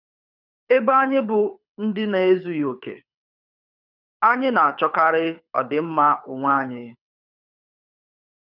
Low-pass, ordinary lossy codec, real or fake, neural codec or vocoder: 5.4 kHz; none; fake; codec, 24 kHz, 6 kbps, HILCodec